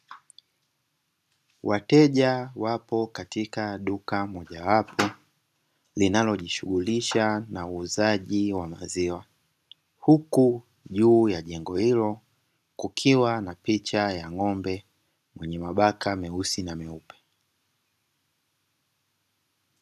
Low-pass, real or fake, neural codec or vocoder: 14.4 kHz; real; none